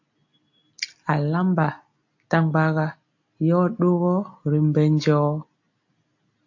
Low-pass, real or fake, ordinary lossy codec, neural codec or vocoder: 7.2 kHz; real; AAC, 48 kbps; none